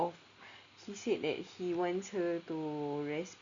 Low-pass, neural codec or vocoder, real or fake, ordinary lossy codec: 7.2 kHz; none; real; Opus, 64 kbps